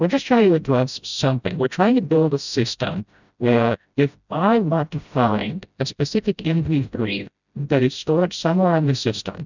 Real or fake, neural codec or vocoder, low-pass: fake; codec, 16 kHz, 0.5 kbps, FreqCodec, smaller model; 7.2 kHz